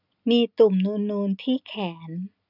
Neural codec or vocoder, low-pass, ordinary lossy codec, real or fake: none; 5.4 kHz; none; real